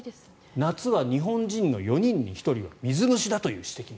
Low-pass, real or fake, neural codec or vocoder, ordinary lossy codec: none; real; none; none